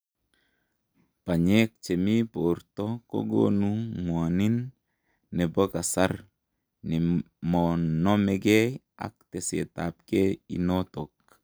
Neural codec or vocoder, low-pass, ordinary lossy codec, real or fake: none; none; none; real